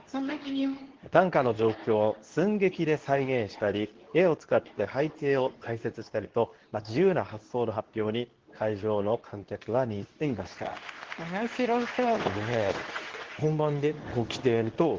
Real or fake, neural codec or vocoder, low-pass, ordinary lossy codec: fake; codec, 24 kHz, 0.9 kbps, WavTokenizer, medium speech release version 2; 7.2 kHz; Opus, 16 kbps